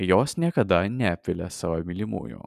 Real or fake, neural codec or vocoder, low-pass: fake; vocoder, 44.1 kHz, 128 mel bands every 512 samples, BigVGAN v2; 14.4 kHz